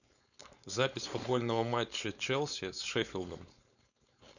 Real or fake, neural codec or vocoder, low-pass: fake; codec, 16 kHz, 4.8 kbps, FACodec; 7.2 kHz